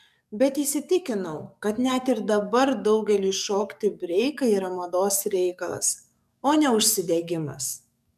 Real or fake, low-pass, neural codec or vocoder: fake; 14.4 kHz; codec, 44.1 kHz, 7.8 kbps, DAC